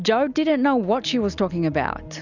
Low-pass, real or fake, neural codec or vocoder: 7.2 kHz; real; none